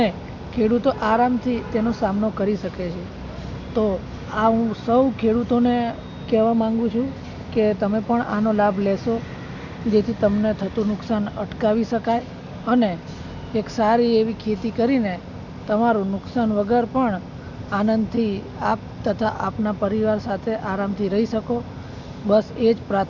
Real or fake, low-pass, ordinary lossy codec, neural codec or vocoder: real; 7.2 kHz; none; none